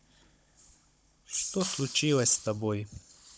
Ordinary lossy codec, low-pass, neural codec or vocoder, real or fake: none; none; codec, 16 kHz, 16 kbps, FunCodec, trained on Chinese and English, 50 frames a second; fake